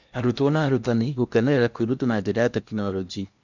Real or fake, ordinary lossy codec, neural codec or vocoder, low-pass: fake; none; codec, 16 kHz in and 24 kHz out, 0.8 kbps, FocalCodec, streaming, 65536 codes; 7.2 kHz